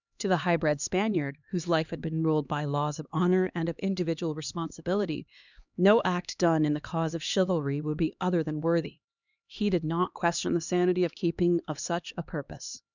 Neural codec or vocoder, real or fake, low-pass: codec, 16 kHz, 2 kbps, X-Codec, HuBERT features, trained on LibriSpeech; fake; 7.2 kHz